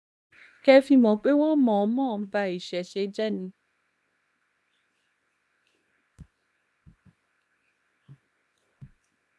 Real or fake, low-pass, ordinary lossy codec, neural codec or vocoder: fake; none; none; codec, 24 kHz, 0.9 kbps, WavTokenizer, small release